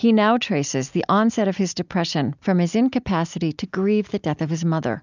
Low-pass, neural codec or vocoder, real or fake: 7.2 kHz; none; real